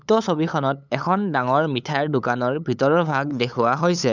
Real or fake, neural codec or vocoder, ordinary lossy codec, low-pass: fake; codec, 16 kHz, 8 kbps, FunCodec, trained on LibriTTS, 25 frames a second; none; 7.2 kHz